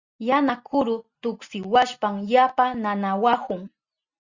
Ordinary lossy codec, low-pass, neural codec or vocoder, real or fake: Opus, 64 kbps; 7.2 kHz; none; real